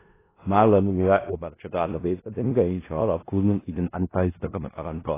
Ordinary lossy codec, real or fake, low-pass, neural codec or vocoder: AAC, 16 kbps; fake; 3.6 kHz; codec, 16 kHz in and 24 kHz out, 0.4 kbps, LongCat-Audio-Codec, four codebook decoder